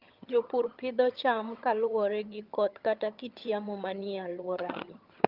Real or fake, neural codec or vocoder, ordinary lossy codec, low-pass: fake; vocoder, 22.05 kHz, 80 mel bands, HiFi-GAN; Opus, 24 kbps; 5.4 kHz